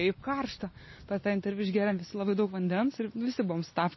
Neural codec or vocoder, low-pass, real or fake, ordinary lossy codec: none; 7.2 kHz; real; MP3, 24 kbps